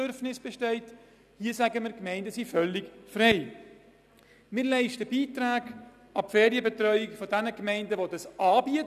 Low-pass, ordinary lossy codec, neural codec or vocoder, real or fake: 14.4 kHz; none; none; real